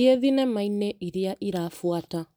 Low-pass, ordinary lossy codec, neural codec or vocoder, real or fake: none; none; none; real